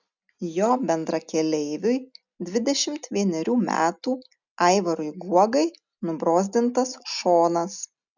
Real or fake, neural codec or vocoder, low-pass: real; none; 7.2 kHz